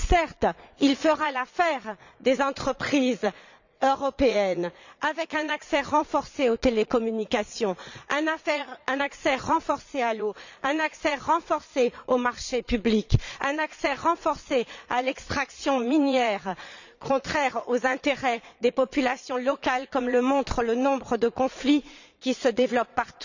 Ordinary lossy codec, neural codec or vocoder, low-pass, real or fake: none; vocoder, 22.05 kHz, 80 mel bands, Vocos; 7.2 kHz; fake